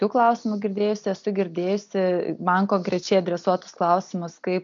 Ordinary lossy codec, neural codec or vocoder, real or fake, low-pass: MP3, 64 kbps; none; real; 7.2 kHz